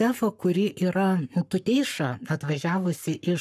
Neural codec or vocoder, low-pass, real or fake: codec, 44.1 kHz, 7.8 kbps, Pupu-Codec; 14.4 kHz; fake